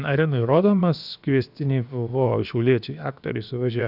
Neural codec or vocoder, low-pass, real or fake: codec, 16 kHz, about 1 kbps, DyCAST, with the encoder's durations; 5.4 kHz; fake